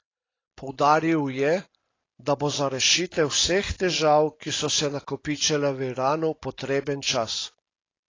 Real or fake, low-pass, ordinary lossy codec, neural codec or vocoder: real; 7.2 kHz; AAC, 32 kbps; none